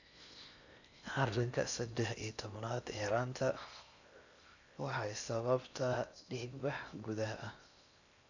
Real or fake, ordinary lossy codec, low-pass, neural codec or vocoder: fake; none; 7.2 kHz; codec, 16 kHz in and 24 kHz out, 0.6 kbps, FocalCodec, streaming, 4096 codes